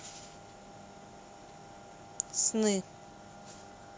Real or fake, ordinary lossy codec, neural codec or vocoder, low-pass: real; none; none; none